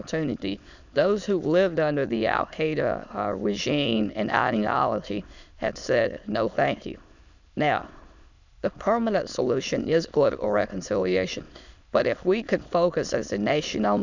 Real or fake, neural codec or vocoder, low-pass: fake; autoencoder, 22.05 kHz, a latent of 192 numbers a frame, VITS, trained on many speakers; 7.2 kHz